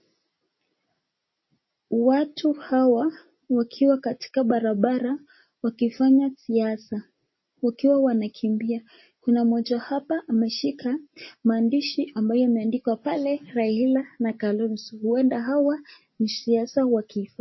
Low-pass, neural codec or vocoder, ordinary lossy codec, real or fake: 7.2 kHz; none; MP3, 24 kbps; real